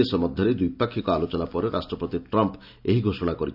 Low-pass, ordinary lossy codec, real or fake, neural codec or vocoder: 5.4 kHz; none; real; none